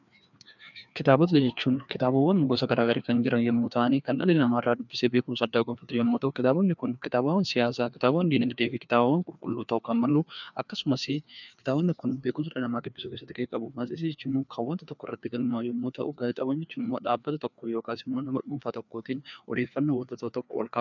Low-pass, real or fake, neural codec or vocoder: 7.2 kHz; fake; codec, 16 kHz, 2 kbps, FreqCodec, larger model